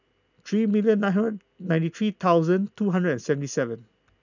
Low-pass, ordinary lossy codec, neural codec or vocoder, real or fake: 7.2 kHz; none; none; real